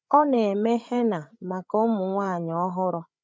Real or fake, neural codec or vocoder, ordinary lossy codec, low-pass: fake; codec, 16 kHz, 8 kbps, FreqCodec, larger model; none; none